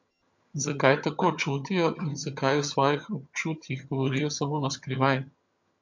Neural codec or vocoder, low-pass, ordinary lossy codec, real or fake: vocoder, 22.05 kHz, 80 mel bands, HiFi-GAN; 7.2 kHz; MP3, 48 kbps; fake